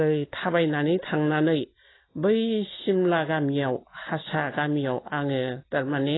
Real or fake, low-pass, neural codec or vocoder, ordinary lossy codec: real; 7.2 kHz; none; AAC, 16 kbps